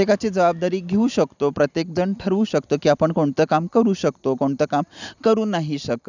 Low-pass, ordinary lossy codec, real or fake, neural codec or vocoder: 7.2 kHz; none; real; none